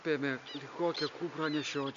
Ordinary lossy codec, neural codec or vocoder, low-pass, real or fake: MP3, 48 kbps; none; 7.2 kHz; real